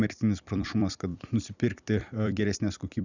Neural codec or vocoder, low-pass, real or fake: vocoder, 44.1 kHz, 128 mel bands every 256 samples, BigVGAN v2; 7.2 kHz; fake